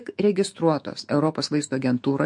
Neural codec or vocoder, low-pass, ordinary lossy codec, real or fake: autoencoder, 48 kHz, 128 numbers a frame, DAC-VAE, trained on Japanese speech; 10.8 kHz; MP3, 48 kbps; fake